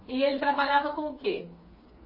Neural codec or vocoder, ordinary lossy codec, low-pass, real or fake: codec, 16 kHz, 4 kbps, FreqCodec, smaller model; MP3, 24 kbps; 5.4 kHz; fake